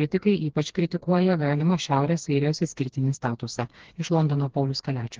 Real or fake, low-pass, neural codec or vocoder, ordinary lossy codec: fake; 7.2 kHz; codec, 16 kHz, 2 kbps, FreqCodec, smaller model; Opus, 16 kbps